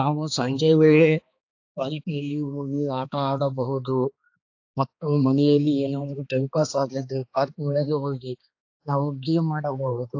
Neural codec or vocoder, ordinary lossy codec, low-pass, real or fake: codec, 16 kHz, 2 kbps, X-Codec, HuBERT features, trained on balanced general audio; AAC, 48 kbps; 7.2 kHz; fake